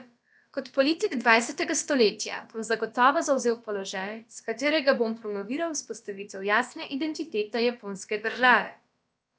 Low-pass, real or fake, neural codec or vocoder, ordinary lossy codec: none; fake; codec, 16 kHz, about 1 kbps, DyCAST, with the encoder's durations; none